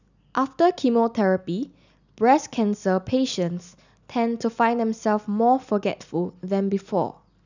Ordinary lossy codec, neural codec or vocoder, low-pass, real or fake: none; none; 7.2 kHz; real